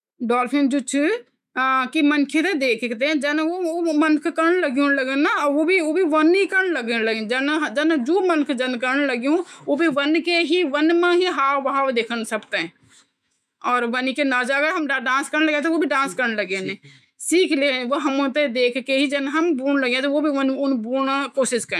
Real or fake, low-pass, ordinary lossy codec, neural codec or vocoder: fake; 14.4 kHz; none; autoencoder, 48 kHz, 128 numbers a frame, DAC-VAE, trained on Japanese speech